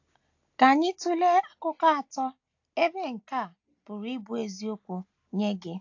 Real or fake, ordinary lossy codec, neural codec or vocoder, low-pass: real; AAC, 48 kbps; none; 7.2 kHz